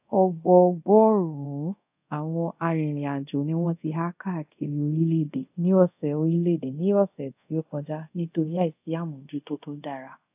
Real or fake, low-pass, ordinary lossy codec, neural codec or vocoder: fake; 3.6 kHz; AAC, 32 kbps; codec, 24 kHz, 0.5 kbps, DualCodec